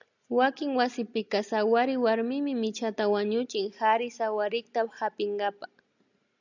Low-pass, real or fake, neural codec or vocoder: 7.2 kHz; real; none